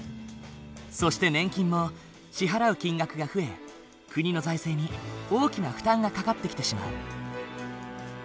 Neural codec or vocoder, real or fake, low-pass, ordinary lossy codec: none; real; none; none